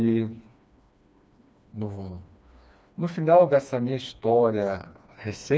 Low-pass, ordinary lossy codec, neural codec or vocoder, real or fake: none; none; codec, 16 kHz, 2 kbps, FreqCodec, smaller model; fake